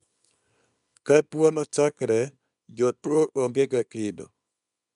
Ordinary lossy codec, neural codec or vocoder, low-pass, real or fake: none; codec, 24 kHz, 0.9 kbps, WavTokenizer, small release; 10.8 kHz; fake